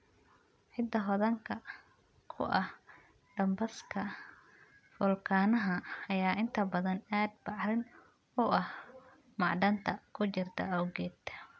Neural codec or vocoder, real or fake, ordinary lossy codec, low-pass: none; real; none; none